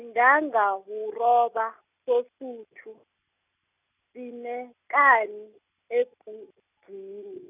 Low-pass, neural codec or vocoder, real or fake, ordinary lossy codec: 3.6 kHz; none; real; none